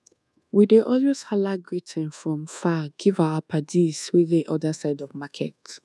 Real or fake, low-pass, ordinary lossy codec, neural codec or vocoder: fake; none; none; codec, 24 kHz, 1.2 kbps, DualCodec